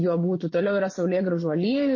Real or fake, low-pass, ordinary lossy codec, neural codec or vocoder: fake; 7.2 kHz; MP3, 32 kbps; vocoder, 24 kHz, 100 mel bands, Vocos